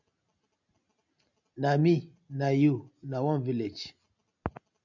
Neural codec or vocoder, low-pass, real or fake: none; 7.2 kHz; real